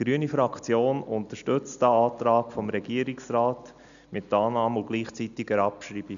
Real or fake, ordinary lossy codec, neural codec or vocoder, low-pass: real; none; none; 7.2 kHz